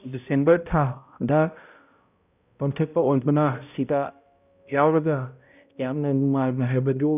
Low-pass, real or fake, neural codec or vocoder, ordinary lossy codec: 3.6 kHz; fake; codec, 16 kHz, 0.5 kbps, X-Codec, HuBERT features, trained on balanced general audio; none